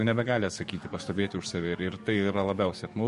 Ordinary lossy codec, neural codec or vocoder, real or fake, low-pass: MP3, 48 kbps; codec, 44.1 kHz, 7.8 kbps, DAC; fake; 14.4 kHz